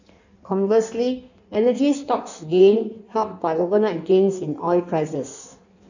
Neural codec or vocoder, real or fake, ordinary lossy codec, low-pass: codec, 16 kHz in and 24 kHz out, 1.1 kbps, FireRedTTS-2 codec; fake; none; 7.2 kHz